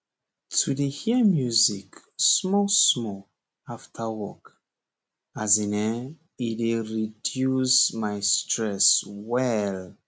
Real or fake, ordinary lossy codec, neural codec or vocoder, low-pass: real; none; none; none